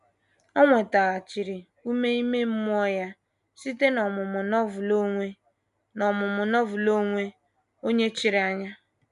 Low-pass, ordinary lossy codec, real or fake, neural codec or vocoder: 10.8 kHz; none; real; none